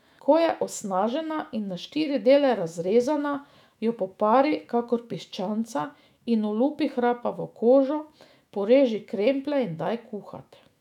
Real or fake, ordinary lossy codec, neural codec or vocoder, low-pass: fake; none; autoencoder, 48 kHz, 128 numbers a frame, DAC-VAE, trained on Japanese speech; 19.8 kHz